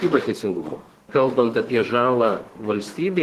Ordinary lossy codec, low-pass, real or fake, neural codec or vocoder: Opus, 16 kbps; 14.4 kHz; fake; codec, 32 kHz, 1.9 kbps, SNAC